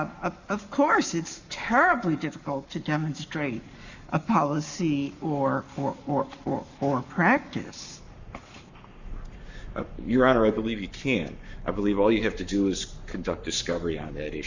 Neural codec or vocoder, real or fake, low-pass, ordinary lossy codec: codec, 44.1 kHz, 7.8 kbps, Pupu-Codec; fake; 7.2 kHz; Opus, 64 kbps